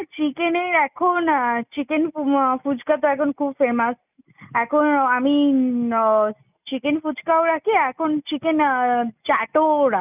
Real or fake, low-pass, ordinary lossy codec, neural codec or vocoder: real; 3.6 kHz; none; none